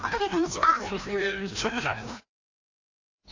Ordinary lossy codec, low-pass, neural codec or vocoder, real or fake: AAC, 48 kbps; 7.2 kHz; codec, 16 kHz, 1 kbps, FreqCodec, larger model; fake